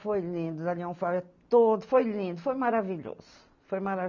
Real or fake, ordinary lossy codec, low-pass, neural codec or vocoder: real; none; 7.2 kHz; none